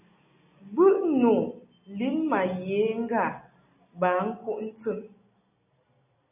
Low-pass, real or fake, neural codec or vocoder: 3.6 kHz; real; none